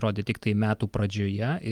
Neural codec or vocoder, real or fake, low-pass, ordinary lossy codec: none; real; 19.8 kHz; Opus, 32 kbps